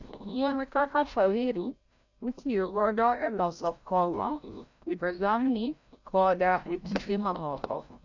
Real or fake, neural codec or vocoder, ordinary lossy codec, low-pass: fake; codec, 16 kHz, 0.5 kbps, FreqCodec, larger model; none; 7.2 kHz